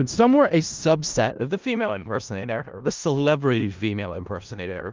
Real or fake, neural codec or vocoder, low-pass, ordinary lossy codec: fake; codec, 16 kHz in and 24 kHz out, 0.4 kbps, LongCat-Audio-Codec, four codebook decoder; 7.2 kHz; Opus, 24 kbps